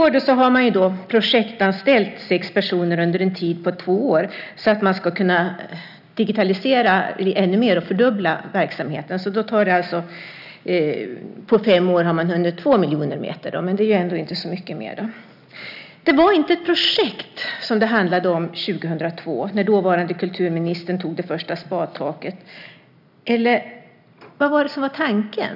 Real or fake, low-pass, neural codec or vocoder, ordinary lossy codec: real; 5.4 kHz; none; none